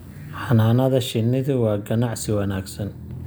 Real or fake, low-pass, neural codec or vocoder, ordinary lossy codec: real; none; none; none